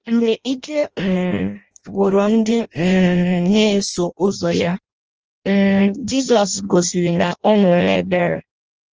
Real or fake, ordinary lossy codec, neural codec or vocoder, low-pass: fake; Opus, 24 kbps; codec, 16 kHz in and 24 kHz out, 0.6 kbps, FireRedTTS-2 codec; 7.2 kHz